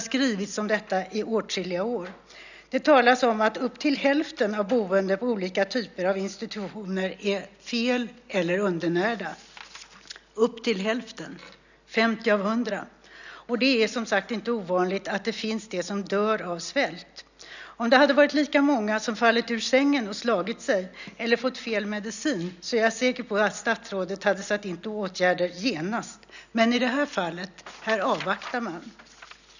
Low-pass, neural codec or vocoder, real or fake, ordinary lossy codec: 7.2 kHz; none; real; none